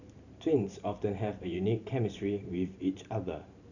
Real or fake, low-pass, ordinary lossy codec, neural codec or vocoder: fake; 7.2 kHz; none; vocoder, 44.1 kHz, 128 mel bands every 512 samples, BigVGAN v2